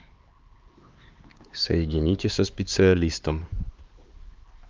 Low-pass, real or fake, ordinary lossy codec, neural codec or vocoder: 7.2 kHz; fake; Opus, 32 kbps; codec, 16 kHz, 4 kbps, X-Codec, HuBERT features, trained on LibriSpeech